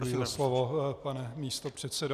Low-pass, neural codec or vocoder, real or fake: 14.4 kHz; none; real